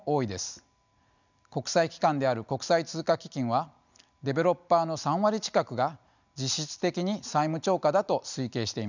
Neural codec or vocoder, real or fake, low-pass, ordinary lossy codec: none; real; 7.2 kHz; none